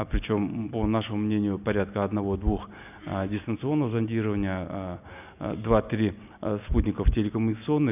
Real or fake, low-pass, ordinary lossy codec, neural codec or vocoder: real; 3.6 kHz; none; none